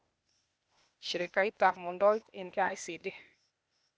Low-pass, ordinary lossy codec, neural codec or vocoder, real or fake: none; none; codec, 16 kHz, 0.8 kbps, ZipCodec; fake